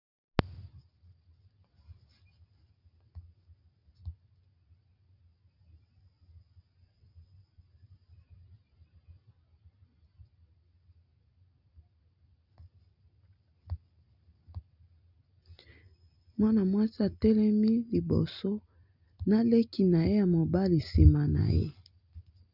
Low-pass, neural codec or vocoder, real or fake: 5.4 kHz; none; real